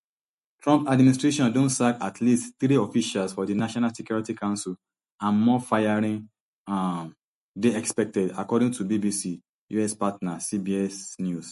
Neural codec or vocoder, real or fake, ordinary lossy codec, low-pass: none; real; MP3, 48 kbps; 14.4 kHz